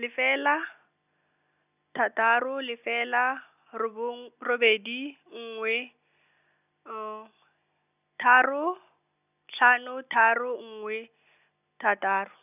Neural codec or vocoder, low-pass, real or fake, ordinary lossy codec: none; 3.6 kHz; real; none